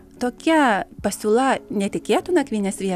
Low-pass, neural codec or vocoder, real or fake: 14.4 kHz; none; real